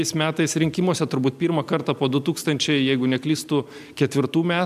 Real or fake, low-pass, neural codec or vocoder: real; 14.4 kHz; none